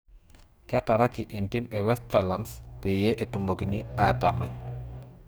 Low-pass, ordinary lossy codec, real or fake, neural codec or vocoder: none; none; fake; codec, 44.1 kHz, 2.6 kbps, DAC